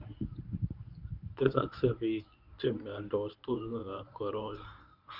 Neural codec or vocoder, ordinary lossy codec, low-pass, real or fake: codec, 24 kHz, 0.9 kbps, WavTokenizer, medium speech release version 1; none; 5.4 kHz; fake